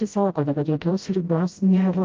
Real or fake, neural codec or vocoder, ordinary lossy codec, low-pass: fake; codec, 16 kHz, 0.5 kbps, FreqCodec, smaller model; Opus, 32 kbps; 7.2 kHz